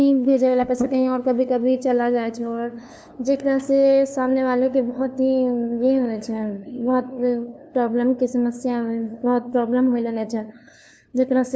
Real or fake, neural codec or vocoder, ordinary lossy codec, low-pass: fake; codec, 16 kHz, 2 kbps, FunCodec, trained on LibriTTS, 25 frames a second; none; none